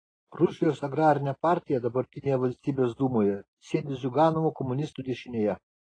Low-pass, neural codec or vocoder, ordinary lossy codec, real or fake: 9.9 kHz; none; AAC, 32 kbps; real